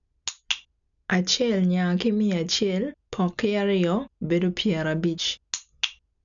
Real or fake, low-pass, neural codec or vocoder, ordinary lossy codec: real; 7.2 kHz; none; none